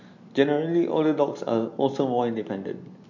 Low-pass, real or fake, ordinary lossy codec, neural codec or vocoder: 7.2 kHz; real; MP3, 48 kbps; none